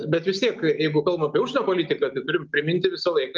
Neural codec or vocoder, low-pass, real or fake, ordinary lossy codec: none; 7.2 kHz; real; Opus, 24 kbps